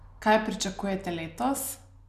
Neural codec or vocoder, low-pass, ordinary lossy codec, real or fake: none; 14.4 kHz; none; real